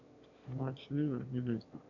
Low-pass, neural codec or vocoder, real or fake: 7.2 kHz; autoencoder, 22.05 kHz, a latent of 192 numbers a frame, VITS, trained on one speaker; fake